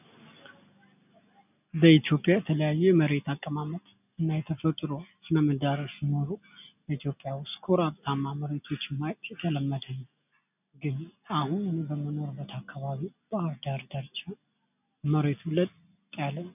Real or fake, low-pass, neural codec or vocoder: real; 3.6 kHz; none